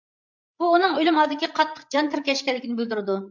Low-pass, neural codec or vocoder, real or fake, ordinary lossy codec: 7.2 kHz; codec, 16 kHz, 8 kbps, FreqCodec, larger model; fake; MP3, 64 kbps